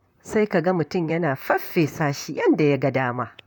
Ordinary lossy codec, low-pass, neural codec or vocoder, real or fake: none; 19.8 kHz; vocoder, 44.1 kHz, 128 mel bands, Pupu-Vocoder; fake